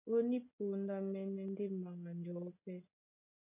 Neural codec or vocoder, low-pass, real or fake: none; 3.6 kHz; real